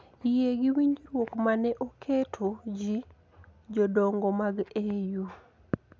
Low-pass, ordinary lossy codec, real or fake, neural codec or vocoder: none; none; real; none